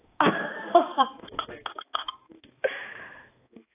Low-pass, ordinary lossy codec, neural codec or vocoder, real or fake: 3.6 kHz; AAC, 24 kbps; codec, 16 kHz, 2 kbps, X-Codec, HuBERT features, trained on balanced general audio; fake